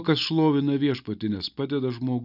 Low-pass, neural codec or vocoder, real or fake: 5.4 kHz; none; real